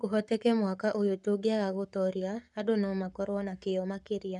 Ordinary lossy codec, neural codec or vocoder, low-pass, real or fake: none; codec, 44.1 kHz, 7.8 kbps, Pupu-Codec; 10.8 kHz; fake